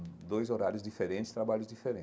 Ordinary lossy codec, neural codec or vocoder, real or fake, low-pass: none; none; real; none